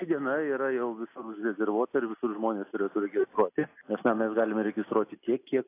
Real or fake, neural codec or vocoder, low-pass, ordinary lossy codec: real; none; 3.6 kHz; AAC, 24 kbps